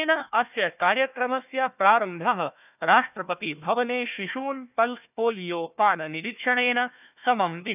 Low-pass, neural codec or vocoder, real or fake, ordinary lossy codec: 3.6 kHz; codec, 16 kHz, 1 kbps, FunCodec, trained on Chinese and English, 50 frames a second; fake; none